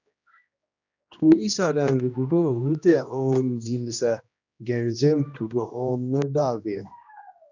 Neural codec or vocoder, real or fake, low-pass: codec, 16 kHz, 1 kbps, X-Codec, HuBERT features, trained on general audio; fake; 7.2 kHz